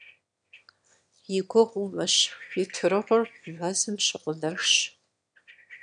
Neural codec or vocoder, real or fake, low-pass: autoencoder, 22.05 kHz, a latent of 192 numbers a frame, VITS, trained on one speaker; fake; 9.9 kHz